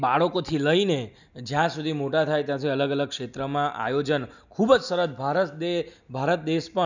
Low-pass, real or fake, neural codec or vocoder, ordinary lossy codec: 7.2 kHz; real; none; none